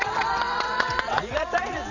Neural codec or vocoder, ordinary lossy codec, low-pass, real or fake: vocoder, 22.05 kHz, 80 mel bands, WaveNeXt; none; 7.2 kHz; fake